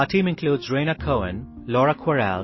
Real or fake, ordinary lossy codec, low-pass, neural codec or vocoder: real; MP3, 24 kbps; 7.2 kHz; none